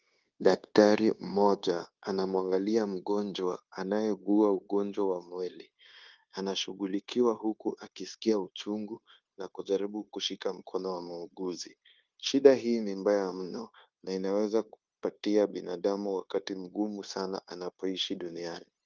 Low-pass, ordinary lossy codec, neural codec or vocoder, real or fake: 7.2 kHz; Opus, 32 kbps; codec, 16 kHz, 0.9 kbps, LongCat-Audio-Codec; fake